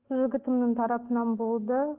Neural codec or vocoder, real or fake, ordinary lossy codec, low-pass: none; real; Opus, 16 kbps; 3.6 kHz